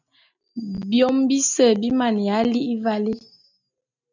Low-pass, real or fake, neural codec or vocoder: 7.2 kHz; real; none